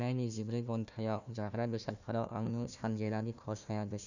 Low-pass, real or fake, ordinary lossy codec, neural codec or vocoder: 7.2 kHz; fake; none; codec, 16 kHz, 1 kbps, FunCodec, trained on Chinese and English, 50 frames a second